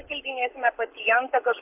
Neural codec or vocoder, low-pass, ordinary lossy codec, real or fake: codec, 44.1 kHz, 7.8 kbps, Pupu-Codec; 3.6 kHz; AAC, 32 kbps; fake